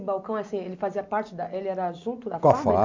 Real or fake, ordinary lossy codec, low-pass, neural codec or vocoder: real; MP3, 64 kbps; 7.2 kHz; none